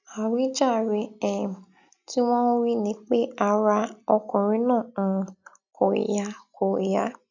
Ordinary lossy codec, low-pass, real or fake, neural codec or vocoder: none; 7.2 kHz; real; none